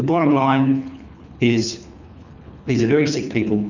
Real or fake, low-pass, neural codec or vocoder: fake; 7.2 kHz; codec, 24 kHz, 3 kbps, HILCodec